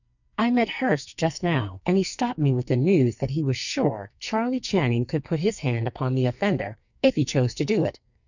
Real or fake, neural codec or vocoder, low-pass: fake; codec, 44.1 kHz, 2.6 kbps, SNAC; 7.2 kHz